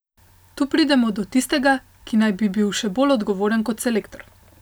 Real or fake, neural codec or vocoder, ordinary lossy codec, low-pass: real; none; none; none